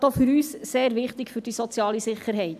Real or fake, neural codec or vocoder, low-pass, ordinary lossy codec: real; none; 14.4 kHz; none